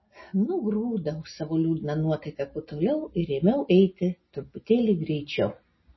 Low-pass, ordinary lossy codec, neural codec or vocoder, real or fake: 7.2 kHz; MP3, 24 kbps; none; real